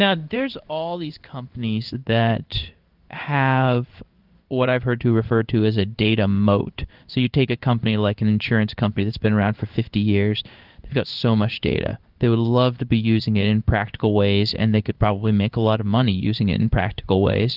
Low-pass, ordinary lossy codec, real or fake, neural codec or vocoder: 5.4 kHz; Opus, 24 kbps; fake; codec, 16 kHz in and 24 kHz out, 1 kbps, XY-Tokenizer